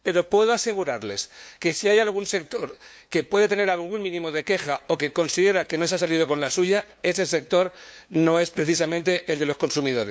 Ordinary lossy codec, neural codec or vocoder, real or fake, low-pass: none; codec, 16 kHz, 2 kbps, FunCodec, trained on LibriTTS, 25 frames a second; fake; none